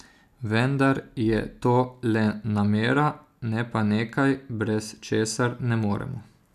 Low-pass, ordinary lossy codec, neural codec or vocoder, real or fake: 14.4 kHz; none; none; real